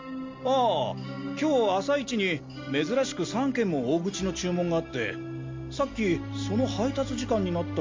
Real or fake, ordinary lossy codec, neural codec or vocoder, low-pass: real; MP3, 48 kbps; none; 7.2 kHz